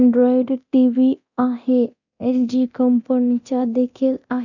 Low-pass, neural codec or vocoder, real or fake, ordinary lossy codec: 7.2 kHz; codec, 24 kHz, 0.9 kbps, DualCodec; fake; none